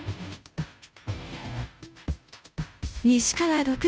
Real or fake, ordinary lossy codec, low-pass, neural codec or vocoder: fake; none; none; codec, 16 kHz, 0.5 kbps, FunCodec, trained on Chinese and English, 25 frames a second